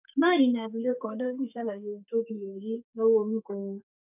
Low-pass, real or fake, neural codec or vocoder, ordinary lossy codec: 3.6 kHz; fake; codec, 32 kHz, 1.9 kbps, SNAC; none